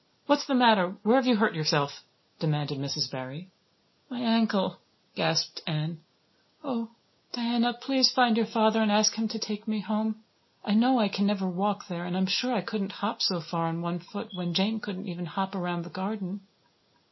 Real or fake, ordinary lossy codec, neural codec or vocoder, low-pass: real; MP3, 24 kbps; none; 7.2 kHz